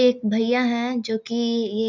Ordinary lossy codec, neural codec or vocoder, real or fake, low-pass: none; none; real; 7.2 kHz